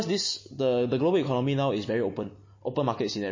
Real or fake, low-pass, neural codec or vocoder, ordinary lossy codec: real; 7.2 kHz; none; MP3, 32 kbps